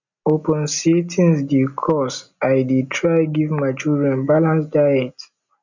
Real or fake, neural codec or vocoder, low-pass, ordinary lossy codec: real; none; 7.2 kHz; none